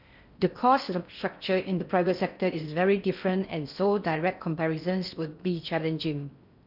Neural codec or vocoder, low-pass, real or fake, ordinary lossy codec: codec, 16 kHz in and 24 kHz out, 0.6 kbps, FocalCodec, streaming, 4096 codes; 5.4 kHz; fake; none